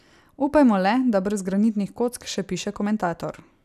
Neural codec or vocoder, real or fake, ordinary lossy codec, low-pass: none; real; none; 14.4 kHz